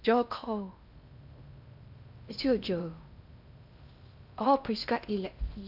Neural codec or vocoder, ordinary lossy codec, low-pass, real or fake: codec, 16 kHz in and 24 kHz out, 0.6 kbps, FocalCodec, streaming, 2048 codes; none; 5.4 kHz; fake